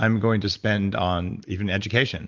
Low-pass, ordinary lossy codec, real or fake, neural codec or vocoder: 7.2 kHz; Opus, 32 kbps; real; none